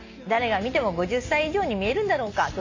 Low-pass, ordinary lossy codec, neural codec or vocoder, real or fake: 7.2 kHz; AAC, 48 kbps; none; real